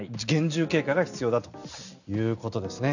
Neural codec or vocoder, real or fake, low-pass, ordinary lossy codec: none; real; 7.2 kHz; none